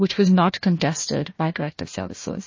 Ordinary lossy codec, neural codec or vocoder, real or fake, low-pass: MP3, 32 kbps; codec, 16 kHz, 1 kbps, FunCodec, trained on Chinese and English, 50 frames a second; fake; 7.2 kHz